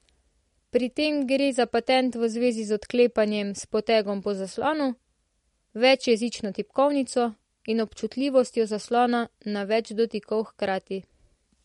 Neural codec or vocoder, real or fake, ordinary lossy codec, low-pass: none; real; MP3, 48 kbps; 19.8 kHz